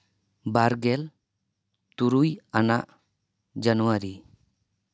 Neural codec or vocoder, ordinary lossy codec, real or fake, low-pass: none; none; real; none